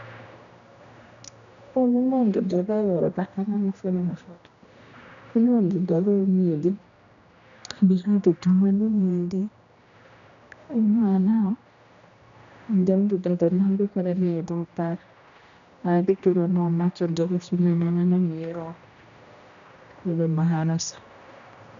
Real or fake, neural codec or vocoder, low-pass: fake; codec, 16 kHz, 1 kbps, X-Codec, HuBERT features, trained on general audio; 7.2 kHz